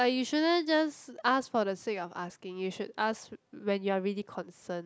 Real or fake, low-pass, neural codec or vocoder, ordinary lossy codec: real; none; none; none